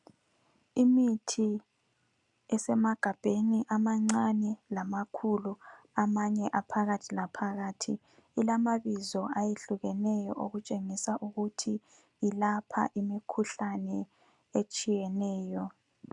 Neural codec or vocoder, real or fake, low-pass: none; real; 10.8 kHz